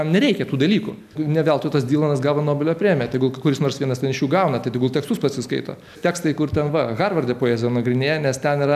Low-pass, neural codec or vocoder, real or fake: 14.4 kHz; none; real